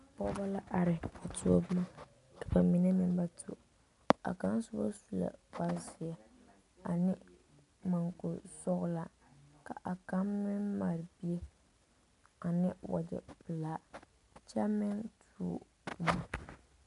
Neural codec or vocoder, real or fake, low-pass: none; real; 10.8 kHz